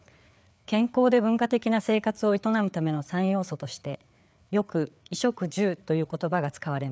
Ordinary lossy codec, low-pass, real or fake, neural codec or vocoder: none; none; fake; codec, 16 kHz, 4 kbps, FreqCodec, larger model